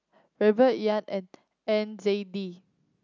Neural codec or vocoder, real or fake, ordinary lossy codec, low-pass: none; real; none; 7.2 kHz